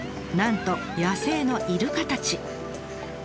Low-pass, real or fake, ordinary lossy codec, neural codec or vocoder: none; real; none; none